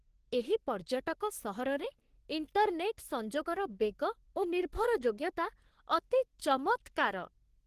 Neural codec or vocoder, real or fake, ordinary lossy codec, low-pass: codec, 44.1 kHz, 3.4 kbps, Pupu-Codec; fake; Opus, 16 kbps; 14.4 kHz